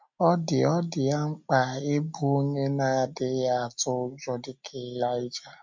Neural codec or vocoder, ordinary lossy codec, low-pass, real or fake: none; MP3, 64 kbps; 7.2 kHz; real